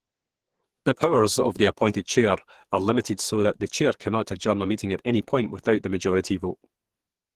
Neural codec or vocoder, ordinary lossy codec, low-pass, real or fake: codec, 44.1 kHz, 2.6 kbps, SNAC; Opus, 16 kbps; 14.4 kHz; fake